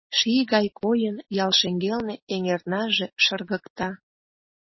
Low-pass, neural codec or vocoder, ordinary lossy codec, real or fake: 7.2 kHz; none; MP3, 24 kbps; real